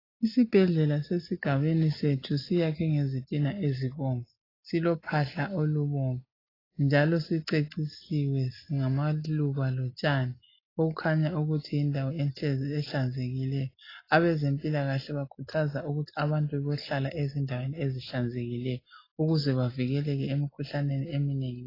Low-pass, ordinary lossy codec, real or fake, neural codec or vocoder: 5.4 kHz; AAC, 24 kbps; real; none